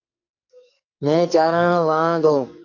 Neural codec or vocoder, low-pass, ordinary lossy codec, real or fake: codec, 44.1 kHz, 2.6 kbps, SNAC; 7.2 kHz; AAC, 48 kbps; fake